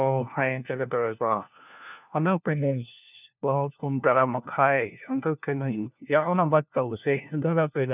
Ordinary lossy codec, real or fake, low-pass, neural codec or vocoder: none; fake; 3.6 kHz; codec, 16 kHz, 1 kbps, FunCodec, trained on LibriTTS, 50 frames a second